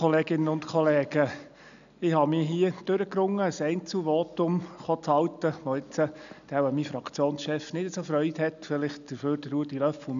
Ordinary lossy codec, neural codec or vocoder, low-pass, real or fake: none; none; 7.2 kHz; real